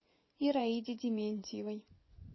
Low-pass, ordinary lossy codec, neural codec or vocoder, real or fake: 7.2 kHz; MP3, 24 kbps; vocoder, 24 kHz, 100 mel bands, Vocos; fake